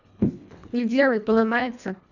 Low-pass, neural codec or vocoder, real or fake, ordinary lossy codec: 7.2 kHz; codec, 24 kHz, 1.5 kbps, HILCodec; fake; none